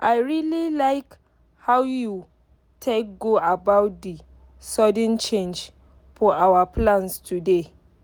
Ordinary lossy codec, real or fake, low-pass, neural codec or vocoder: none; real; none; none